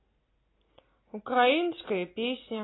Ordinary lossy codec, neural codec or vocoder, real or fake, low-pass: AAC, 16 kbps; none; real; 7.2 kHz